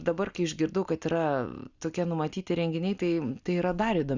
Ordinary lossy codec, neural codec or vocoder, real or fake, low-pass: Opus, 64 kbps; none; real; 7.2 kHz